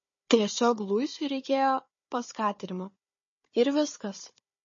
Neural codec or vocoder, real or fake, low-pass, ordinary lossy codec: codec, 16 kHz, 4 kbps, FunCodec, trained on Chinese and English, 50 frames a second; fake; 7.2 kHz; MP3, 32 kbps